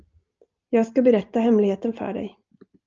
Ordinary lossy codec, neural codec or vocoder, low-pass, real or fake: Opus, 24 kbps; none; 7.2 kHz; real